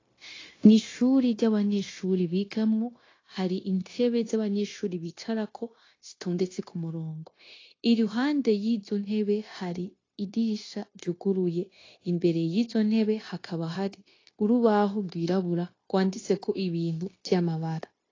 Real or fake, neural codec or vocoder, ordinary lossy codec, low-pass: fake; codec, 16 kHz, 0.9 kbps, LongCat-Audio-Codec; AAC, 32 kbps; 7.2 kHz